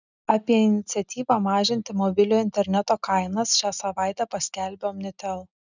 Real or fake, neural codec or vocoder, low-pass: real; none; 7.2 kHz